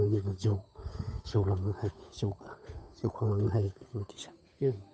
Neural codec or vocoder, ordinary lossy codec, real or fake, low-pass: codec, 16 kHz, 8 kbps, FunCodec, trained on Chinese and English, 25 frames a second; none; fake; none